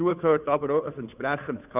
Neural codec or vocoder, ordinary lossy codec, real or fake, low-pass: codec, 16 kHz, 2 kbps, FunCodec, trained on Chinese and English, 25 frames a second; none; fake; 3.6 kHz